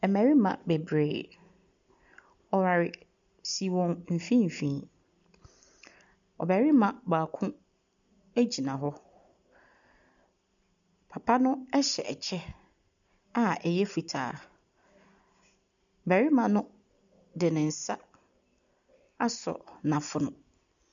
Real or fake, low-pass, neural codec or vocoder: real; 7.2 kHz; none